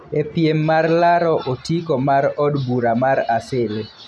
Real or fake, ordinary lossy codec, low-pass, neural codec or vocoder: real; none; none; none